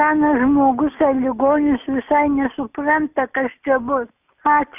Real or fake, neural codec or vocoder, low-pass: real; none; 3.6 kHz